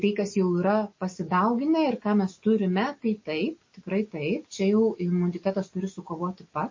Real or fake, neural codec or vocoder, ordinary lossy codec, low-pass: real; none; MP3, 32 kbps; 7.2 kHz